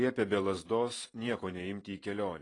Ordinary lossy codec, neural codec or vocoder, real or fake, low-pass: AAC, 32 kbps; vocoder, 44.1 kHz, 128 mel bands every 512 samples, BigVGAN v2; fake; 10.8 kHz